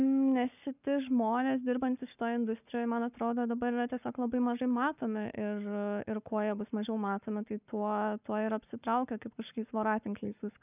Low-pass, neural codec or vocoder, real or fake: 3.6 kHz; codec, 44.1 kHz, 7.8 kbps, Pupu-Codec; fake